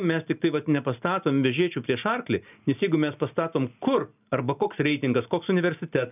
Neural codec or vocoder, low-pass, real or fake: none; 3.6 kHz; real